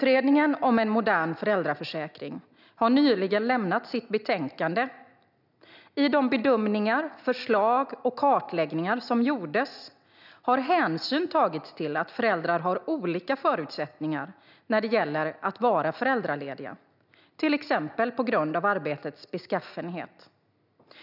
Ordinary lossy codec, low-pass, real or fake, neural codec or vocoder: none; 5.4 kHz; real; none